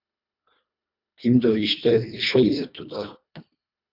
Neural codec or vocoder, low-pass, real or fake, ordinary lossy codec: codec, 24 kHz, 1.5 kbps, HILCodec; 5.4 kHz; fake; MP3, 48 kbps